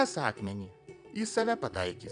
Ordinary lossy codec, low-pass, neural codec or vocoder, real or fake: MP3, 96 kbps; 9.9 kHz; vocoder, 22.05 kHz, 80 mel bands, Vocos; fake